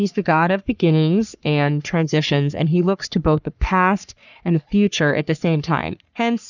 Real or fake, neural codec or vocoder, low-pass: fake; codec, 44.1 kHz, 3.4 kbps, Pupu-Codec; 7.2 kHz